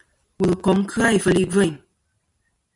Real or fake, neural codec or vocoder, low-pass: fake; vocoder, 44.1 kHz, 128 mel bands every 256 samples, BigVGAN v2; 10.8 kHz